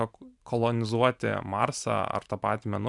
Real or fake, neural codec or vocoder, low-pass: fake; vocoder, 44.1 kHz, 128 mel bands every 512 samples, BigVGAN v2; 10.8 kHz